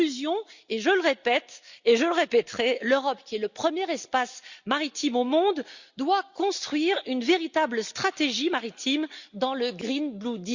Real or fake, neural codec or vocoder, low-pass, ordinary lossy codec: real; none; 7.2 kHz; Opus, 64 kbps